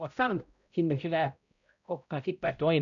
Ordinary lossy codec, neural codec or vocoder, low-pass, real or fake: none; codec, 16 kHz, 0.5 kbps, X-Codec, HuBERT features, trained on balanced general audio; 7.2 kHz; fake